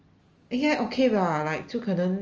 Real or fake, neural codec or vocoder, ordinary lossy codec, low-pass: real; none; Opus, 24 kbps; 7.2 kHz